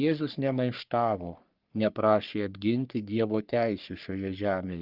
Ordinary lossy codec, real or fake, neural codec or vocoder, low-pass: Opus, 32 kbps; fake; codec, 44.1 kHz, 3.4 kbps, Pupu-Codec; 5.4 kHz